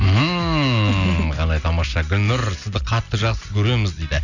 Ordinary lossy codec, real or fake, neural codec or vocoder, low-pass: none; real; none; 7.2 kHz